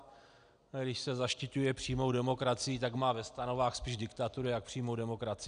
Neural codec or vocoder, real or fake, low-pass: none; real; 9.9 kHz